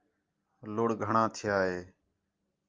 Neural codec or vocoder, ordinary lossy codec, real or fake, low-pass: none; Opus, 24 kbps; real; 7.2 kHz